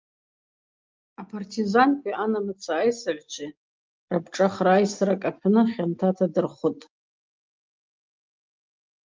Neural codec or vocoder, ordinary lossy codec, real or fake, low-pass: none; Opus, 32 kbps; real; 7.2 kHz